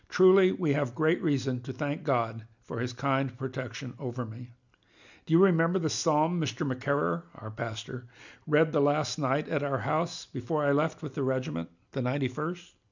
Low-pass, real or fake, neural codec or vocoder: 7.2 kHz; real; none